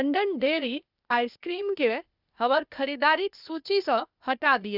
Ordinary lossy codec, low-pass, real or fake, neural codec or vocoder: none; 5.4 kHz; fake; codec, 16 kHz, 0.8 kbps, ZipCodec